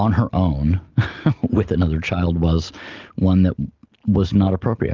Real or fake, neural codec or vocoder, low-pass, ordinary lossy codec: real; none; 7.2 kHz; Opus, 32 kbps